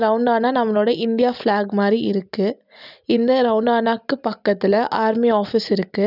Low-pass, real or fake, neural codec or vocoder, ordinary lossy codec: 5.4 kHz; real; none; none